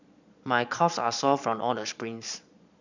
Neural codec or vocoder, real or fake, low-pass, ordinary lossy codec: vocoder, 22.05 kHz, 80 mel bands, Vocos; fake; 7.2 kHz; none